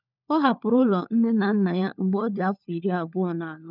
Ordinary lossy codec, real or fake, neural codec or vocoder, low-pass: none; fake; codec, 16 kHz, 4 kbps, FunCodec, trained on LibriTTS, 50 frames a second; 5.4 kHz